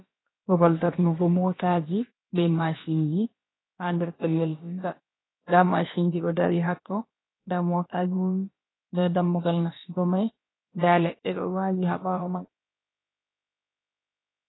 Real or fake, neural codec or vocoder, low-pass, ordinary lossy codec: fake; codec, 16 kHz, about 1 kbps, DyCAST, with the encoder's durations; 7.2 kHz; AAC, 16 kbps